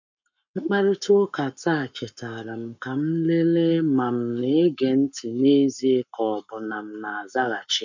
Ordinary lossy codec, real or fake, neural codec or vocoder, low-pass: none; fake; codec, 44.1 kHz, 7.8 kbps, Pupu-Codec; 7.2 kHz